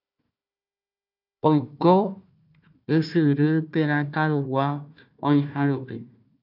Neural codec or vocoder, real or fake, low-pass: codec, 16 kHz, 1 kbps, FunCodec, trained on Chinese and English, 50 frames a second; fake; 5.4 kHz